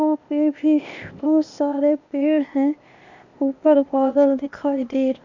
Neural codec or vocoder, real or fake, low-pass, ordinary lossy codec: codec, 16 kHz, 0.8 kbps, ZipCodec; fake; 7.2 kHz; none